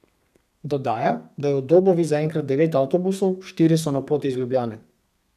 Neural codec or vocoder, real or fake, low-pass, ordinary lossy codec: codec, 32 kHz, 1.9 kbps, SNAC; fake; 14.4 kHz; none